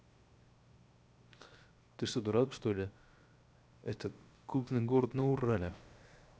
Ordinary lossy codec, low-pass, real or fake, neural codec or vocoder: none; none; fake; codec, 16 kHz, 0.7 kbps, FocalCodec